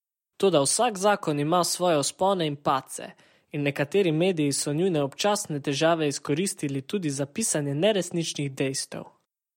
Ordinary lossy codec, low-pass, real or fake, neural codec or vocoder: MP3, 64 kbps; 19.8 kHz; real; none